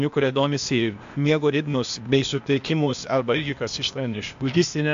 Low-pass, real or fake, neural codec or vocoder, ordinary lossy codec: 7.2 kHz; fake; codec, 16 kHz, 0.8 kbps, ZipCodec; AAC, 64 kbps